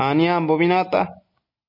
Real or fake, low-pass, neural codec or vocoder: real; 5.4 kHz; none